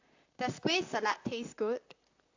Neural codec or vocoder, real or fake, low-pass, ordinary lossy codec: vocoder, 44.1 kHz, 128 mel bands, Pupu-Vocoder; fake; 7.2 kHz; AAC, 48 kbps